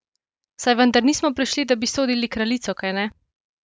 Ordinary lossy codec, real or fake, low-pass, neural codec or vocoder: none; real; none; none